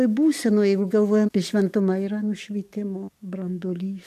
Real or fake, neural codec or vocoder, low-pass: fake; codec, 44.1 kHz, 7.8 kbps, DAC; 14.4 kHz